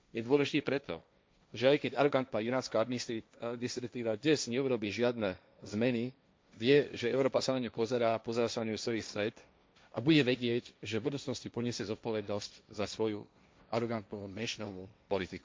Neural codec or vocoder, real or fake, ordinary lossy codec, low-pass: codec, 16 kHz, 1.1 kbps, Voila-Tokenizer; fake; none; none